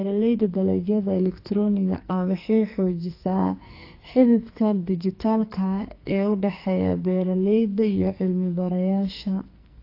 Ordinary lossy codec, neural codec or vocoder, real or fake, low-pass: AAC, 32 kbps; codec, 44.1 kHz, 2.6 kbps, SNAC; fake; 5.4 kHz